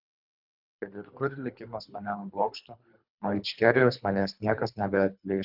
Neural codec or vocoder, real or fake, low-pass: codec, 24 kHz, 3 kbps, HILCodec; fake; 5.4 kHz